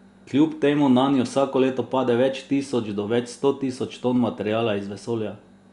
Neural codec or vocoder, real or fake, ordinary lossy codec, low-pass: none; real; Opus, 64 kbps; 10.8 kHz